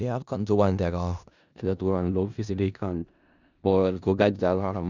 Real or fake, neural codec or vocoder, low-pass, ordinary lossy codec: fake; codec, 16 kHz in and 24 kHz out, 0.4 kbps, LongCat-Audio-Codec, four codebook decoder; 7.2 kHz; none